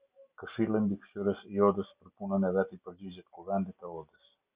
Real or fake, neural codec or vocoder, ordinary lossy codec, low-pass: real; none; Opus, 64 kbps; 3.6 kHz